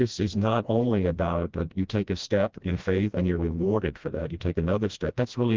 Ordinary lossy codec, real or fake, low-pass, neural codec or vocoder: Opus, 32 kbps; fake; 7.2 kHz; codec, 16 kHz, 1 kbps, FreqCodec, smaller model